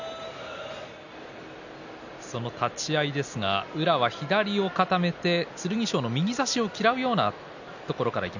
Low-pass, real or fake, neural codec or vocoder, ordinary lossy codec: 7.2 kHz; real; none; none